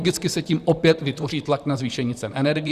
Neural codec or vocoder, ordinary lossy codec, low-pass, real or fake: vocoder, 44.1 kHz, 128 mel bands, Pupu-Vocoder; Opus, 64 kbps; 14.4 kHz; fake